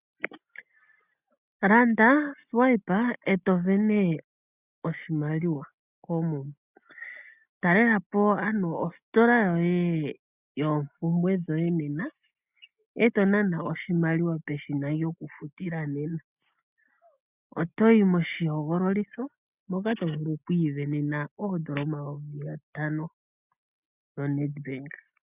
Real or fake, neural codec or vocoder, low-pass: real; none; 3.6 kHz